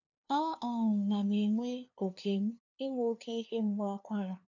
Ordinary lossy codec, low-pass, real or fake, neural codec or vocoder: none; 7.2 kHz; fake; codec, 16 kHz, 2 kbps, FunCodec, trained on LibriTTS, 25 frames a second